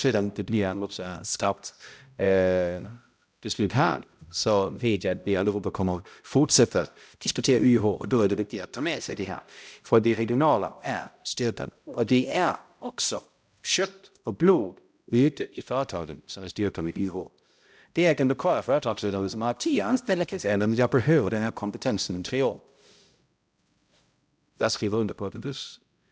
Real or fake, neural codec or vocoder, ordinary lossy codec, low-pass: fake; codec, 16 kHz, 0.5 kbps, X-Codec, HuBERT features, trained on balanced general audio; none; none